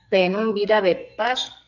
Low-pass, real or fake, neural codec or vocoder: 7.2 kHz; fake; codec, 44.1 kHz, 2.6 kbps, SNAC